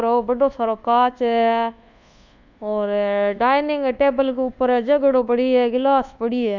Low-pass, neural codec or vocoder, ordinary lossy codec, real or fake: 7.2 kHz; codec, 24 kHz, 1.2 kbps, DualCodec; none; fake